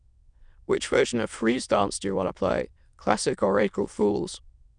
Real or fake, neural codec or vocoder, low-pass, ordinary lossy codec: fake; autoencoder, 22.05 kHz, a latent of 192 numbers a frame, VITS, trained on many speakers; 9.9 kHz; none